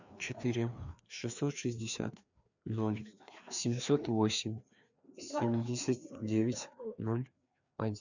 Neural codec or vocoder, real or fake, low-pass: codec, 16 kHz, 2 kbps, FreqCodec, larger model; fake; 7.2 kHz